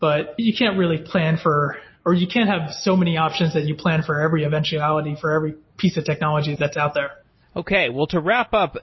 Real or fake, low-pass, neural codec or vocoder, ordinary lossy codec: real; 7.2 kHz; none; MP3, 24 kbps